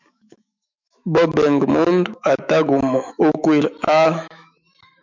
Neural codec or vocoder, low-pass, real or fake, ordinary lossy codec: autoencoder, 48 kHz, 128 numbers a frame, DAC-VAE, trained on Japanese speech; 7.2 kHz; fake; MP3, 64 kbps